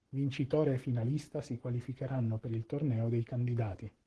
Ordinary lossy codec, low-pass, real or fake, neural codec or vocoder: Opus, 16 kbps; 10.8 kHz; fake; codec, 44.1 kHz, 7.8 kbps, Pupu-Codec